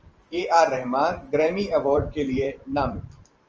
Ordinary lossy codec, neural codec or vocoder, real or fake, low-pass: Opus, 24 kbps; vocoder, 44.1 kHz, 128 mel bands every 512 samples, BigVGAN v2; fake; 7.2 kHz